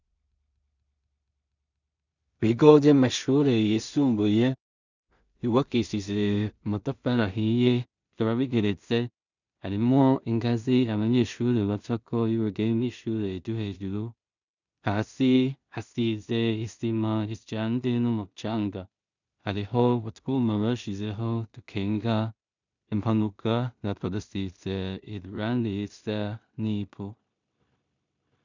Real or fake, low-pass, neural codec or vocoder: fake; 7.2 kHz; codec, 16 kHz in and 24 kHz out, 0.4 kbps, LongCat-Audio-Codec, two codebook decoder